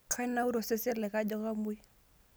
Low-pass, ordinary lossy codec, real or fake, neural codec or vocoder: none; none; real; none